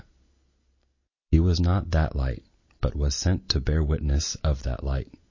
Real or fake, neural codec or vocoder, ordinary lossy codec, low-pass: real; none; MP3, 32 kbps; 7.2 kHz